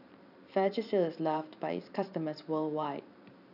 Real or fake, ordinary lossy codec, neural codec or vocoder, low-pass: fake; none; vocoder, 44.1 kHz, 128 mel bands every 512 samples, BigVGAN v2; 5.4 kHz